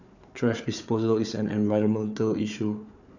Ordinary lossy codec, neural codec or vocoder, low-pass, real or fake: none; codec, 16 kHz, 4 kbps, FunCodec, trained on Chinese and English, 50 frames a second; 7.2 kHz; fake